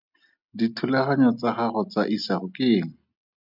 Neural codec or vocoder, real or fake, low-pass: none; real; 5.4 kHz